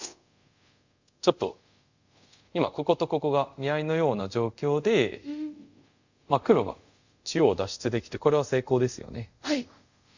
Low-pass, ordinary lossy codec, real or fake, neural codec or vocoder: 7.2 kHz; Opus, 64 kbps; fake; codec, 24 kHz, 0.5 kbps, DualCodec